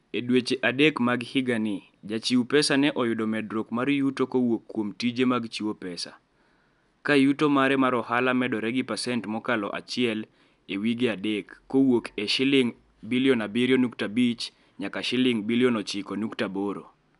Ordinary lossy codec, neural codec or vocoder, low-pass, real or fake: none; none; 10.8 kHz; real